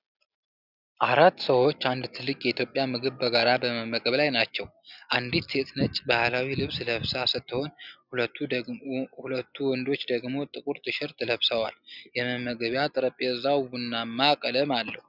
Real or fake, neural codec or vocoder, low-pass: real; none; 5.4 kHz